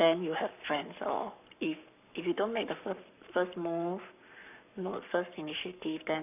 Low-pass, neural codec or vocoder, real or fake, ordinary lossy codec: 3.6 kHz; codec, 44.1 kHz, 7.8 kbps, Pupu-Codec; fake; none